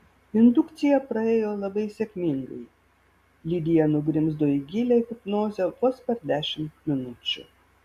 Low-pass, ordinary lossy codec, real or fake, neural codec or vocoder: 14.4 kHz; Opus, 64 kbps; real; none